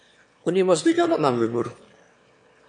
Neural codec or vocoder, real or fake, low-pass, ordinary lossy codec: autoencoder, 22.05 kHz, a latent of 192 numbers a frame, VITS, trained on one speaker; fake; 9.9 kHz; MP3, 64 kbps